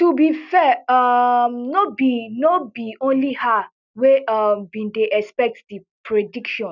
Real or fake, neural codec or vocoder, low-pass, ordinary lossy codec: real; none; 7.2 kHz; none